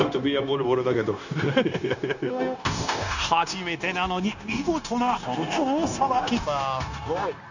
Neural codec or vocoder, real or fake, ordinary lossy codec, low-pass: codec, 16 kHz, 0.9 kbps, LongCat-Audio-Codec; fake; none; 7.2 kHz